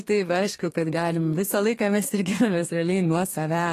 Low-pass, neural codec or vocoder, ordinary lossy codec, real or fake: 14.4 kHz; codec, 32 kHz, 1.9 kbps, SNAC; AAC, 48 kbps; fake